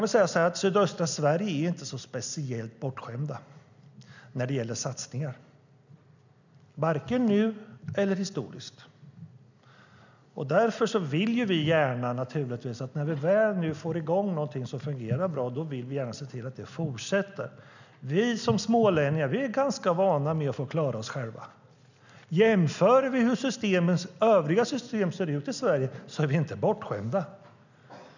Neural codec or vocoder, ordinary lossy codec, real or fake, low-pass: none; none; real; 7.2 kHz